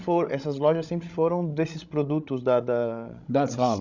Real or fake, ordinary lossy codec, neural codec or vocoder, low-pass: fake; none; codec, 16 kHz, 16 kbps, FreqCodec, larger model; 7.2 kHz